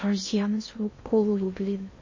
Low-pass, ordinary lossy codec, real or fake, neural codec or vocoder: 7.2 kHz; MP3, 32 kbps; fake; codec, 16 kHz in and 24 kHz out, 0.6 kbps, FocalCodec, streaming, 2048 codes